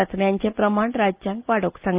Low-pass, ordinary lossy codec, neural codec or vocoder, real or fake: 3.6 kHz; Opus, 24 kbps; none; real